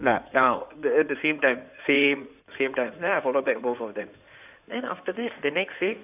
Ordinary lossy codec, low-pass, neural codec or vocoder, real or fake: none; 3.6 kHz; codec, 16 kHz in and 24 kHz out, 2.2 kbps, FireRedTTS-2 codec; fake